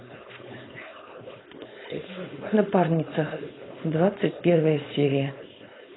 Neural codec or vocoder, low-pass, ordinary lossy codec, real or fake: codec, 16 kHz, 4.8 kbps, FACodec; 7.2 kHz; AAC, 16 kbps; fake